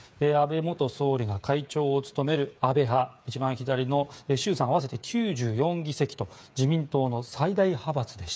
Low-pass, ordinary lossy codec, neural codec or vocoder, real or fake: none; none; codec, 16 kHz, 8 kbps, FreqCodec, smaller model; fake